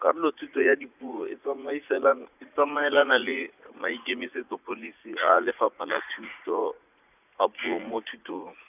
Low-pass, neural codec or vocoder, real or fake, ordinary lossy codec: 3.6 kHz; vocoder, 44.1 kHz, 80 mel bands, Vocos; fake; none